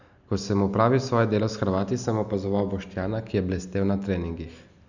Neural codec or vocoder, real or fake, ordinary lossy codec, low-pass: none; real; none; 7.2 kHz